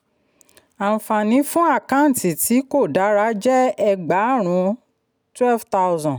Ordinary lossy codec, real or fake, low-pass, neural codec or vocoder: none; real; none; none